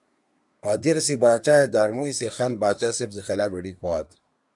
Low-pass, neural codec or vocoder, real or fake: 10.8 kHz; codec, 24 kHz, 1 kbps, SNAC; fake